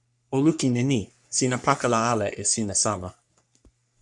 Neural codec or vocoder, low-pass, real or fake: codec, 44.1 kHz, 3.4 kbps, Pupu-Codec; 10.8 kHz; fake